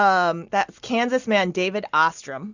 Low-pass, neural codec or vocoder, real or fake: 7.2 kHz; none; real